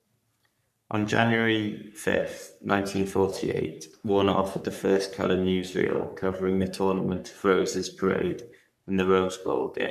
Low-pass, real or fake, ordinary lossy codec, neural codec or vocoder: 14.4 kHz; fake; none; codec, 44.1 kHz, 3.4 kbps, Pupu-Codec